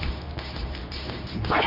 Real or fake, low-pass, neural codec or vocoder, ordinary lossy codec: fake; 5.4 kHz; codec, 24 kHz, 0.9 kbps, WavTokenizer, medium speech release version 2; none